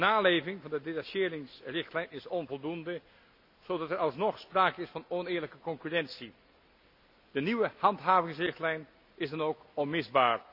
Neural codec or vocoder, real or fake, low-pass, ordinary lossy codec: none; real; 5.4 kHz; none